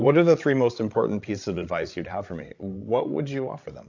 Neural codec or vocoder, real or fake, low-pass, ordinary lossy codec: codec, 16 kHz, 16 kbps, FreqCodec, larger model; fake; 7.2 kHz; AAC, 48 kbps